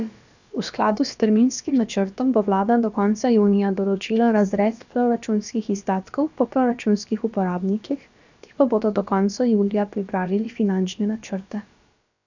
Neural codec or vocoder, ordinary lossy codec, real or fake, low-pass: codec, 16 kHz, about 1 kbps, DyCAST, with the encoder's durations; none; fake; 7.2 kHz